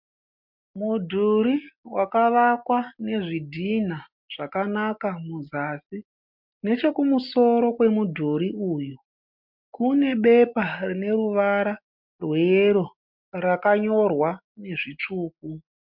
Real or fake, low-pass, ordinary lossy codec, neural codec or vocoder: real; 5.4 kHz; MP3, 48 kbps; none